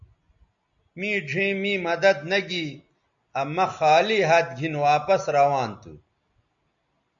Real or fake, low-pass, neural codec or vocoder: real; 7.2 kHz; none